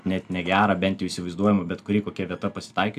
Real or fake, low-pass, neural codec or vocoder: real; 14.4 kHz; none